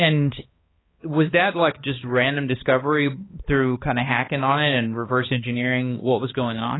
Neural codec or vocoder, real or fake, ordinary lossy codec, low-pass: codec, 16 kHz, 2 kbps, X-Codec, HuBERT features, trained on balanced general audio; fake; AAC, 16 kbps; 7.2 kHz